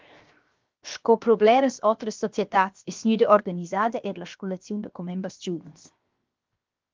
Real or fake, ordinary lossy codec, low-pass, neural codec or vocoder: fake; Opus, 24 kbps; 7.2 kHz; codec, 16 kHz, 0.7 kbps, FocalCodec